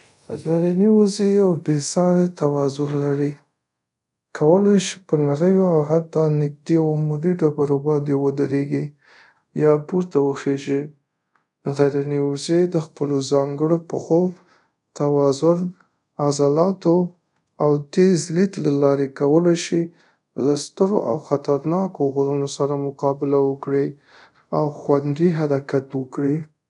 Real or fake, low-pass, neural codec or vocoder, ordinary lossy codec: fake; 10.8 kHz; codec, 24 kHz, 0.5 kbps, DualCodec; none